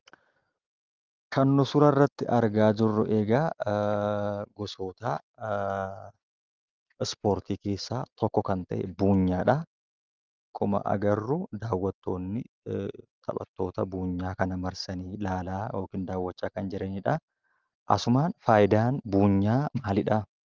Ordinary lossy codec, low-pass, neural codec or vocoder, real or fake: Opus, 24 kbps; 7.2 kHz; none; real